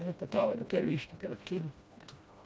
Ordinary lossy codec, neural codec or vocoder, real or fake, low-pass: none; codec, 16 kHz, 1 kbps, FreqCodec, smaller model; fake; none